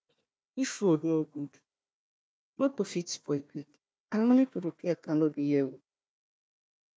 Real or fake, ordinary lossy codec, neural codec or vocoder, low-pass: fake; none; codec, 16 kHz, 1 kbps, FunCodec, trained on Chinese and English, 50 frames a second; none